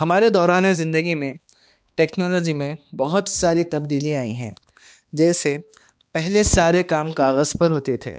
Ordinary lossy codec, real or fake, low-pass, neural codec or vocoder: none; fake; none; codec, 16 kHz, 2 kbps, X-Codec, HuBERT features, trained on balanced general audio